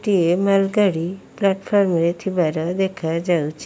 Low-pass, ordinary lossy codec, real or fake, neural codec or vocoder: none; none; real; none